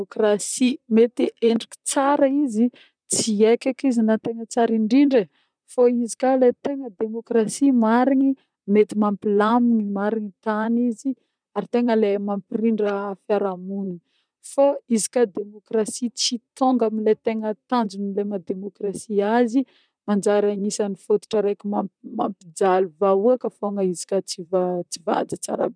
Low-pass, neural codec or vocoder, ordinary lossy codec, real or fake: 9.9 kHz; vocoder, 44.1 kHz, 128 mel bands, Pupu-Vocoder; none; fake